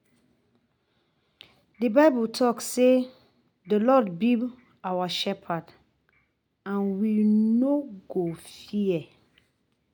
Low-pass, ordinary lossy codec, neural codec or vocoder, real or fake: 19.8 kHz; none; none; real